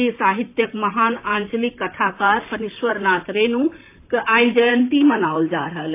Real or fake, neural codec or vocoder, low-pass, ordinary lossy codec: fake; vocoder, 44.1 kHz, 128 mel bands, Pupu-Vocoder; 3.6 kHz; AAC, 24 kbps